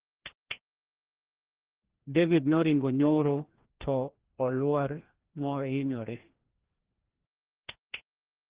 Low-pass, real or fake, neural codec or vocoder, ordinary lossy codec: 3.6 kHz; fake; codec, 16 kHz, 1 kbps, FreqCodec, larger model; Opus, 16 kbps